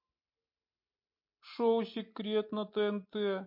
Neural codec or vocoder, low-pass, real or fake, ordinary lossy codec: none; 5.4 kHz; real; MP3, 32 kbps